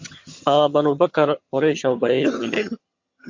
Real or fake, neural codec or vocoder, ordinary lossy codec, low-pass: fake; vocoder, 22.05 kHz, 80 mel bands, HiFi-GAN; MP3, 48 kbps; 7.2 kHz